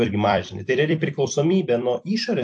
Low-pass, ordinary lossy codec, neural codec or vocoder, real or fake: 10.8 kHz; AAC, 64 kbps; vocoder, 44.1 kHz, 128 mel bands every 256 samples, BigVGAN v2; fake